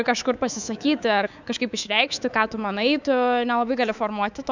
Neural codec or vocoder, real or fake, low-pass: codec, 24 kHz, 3.1 kbps, DualCodec; fake; 7.2 kHz